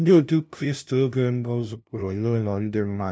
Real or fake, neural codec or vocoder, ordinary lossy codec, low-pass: fake; codec, 16 kHz, 0.5 kbps, FunCodec, trained on LibriTTS, 25 frames a second; none; none